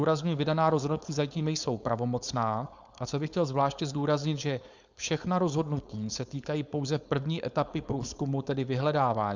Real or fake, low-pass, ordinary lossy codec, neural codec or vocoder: fake; 7.2 kHz; Opus, 64 kbps; codec, 16 kHz, 4.8 kbps, FACodec